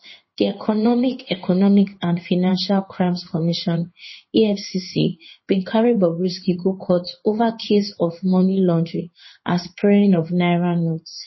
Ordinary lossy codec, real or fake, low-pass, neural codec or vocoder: MP3, 24 kbps; fake; 7.2 kHz; codec, 16 kHz in and 24 kHz out, 1 kbps, XY-Tokenizer